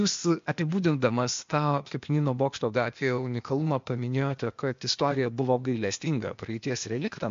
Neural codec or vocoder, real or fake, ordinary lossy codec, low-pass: codec, 16 kHz, 0.8 kbps, ZipCodec; fake; MP3, 48 kbps; 7.2 kHz